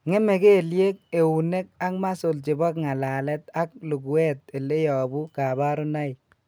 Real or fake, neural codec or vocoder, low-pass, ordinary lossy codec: real; none; none; none